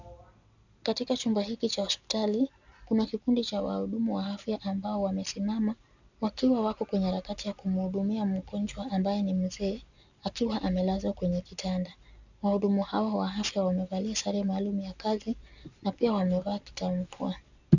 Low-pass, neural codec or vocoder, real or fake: 7.2 kHz; none; real